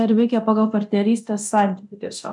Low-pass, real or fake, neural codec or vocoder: 10.8 kHz; fake; codec, 24 kHz, 0.9 kbps, DualCodec